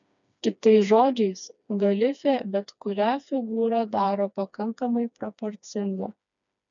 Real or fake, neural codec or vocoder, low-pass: fake; codec, 16 kHz, 2 kbps, FreqCodec, smaller model; 7.2 kHz